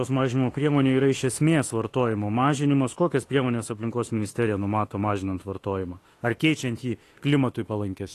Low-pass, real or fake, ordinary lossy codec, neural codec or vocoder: 14.4 kHz; fake; AAC, 48 kbps; autoencoder, 48 kHz, 32 numbers a frame, DAC-VAE, trained on Japanese speech